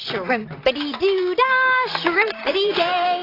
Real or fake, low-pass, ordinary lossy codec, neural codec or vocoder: fake; 5.4 kHz; AAC, 24 kbps; vocoder, 44.1 kHz, 128 mel bands, Pupu-Vocoder